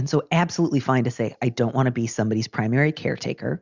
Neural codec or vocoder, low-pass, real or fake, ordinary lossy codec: none; 7.2 kHz; real; Opus, 64 kbps